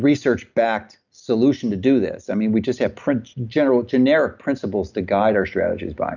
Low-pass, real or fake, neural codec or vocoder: 7.2 kHz; real; none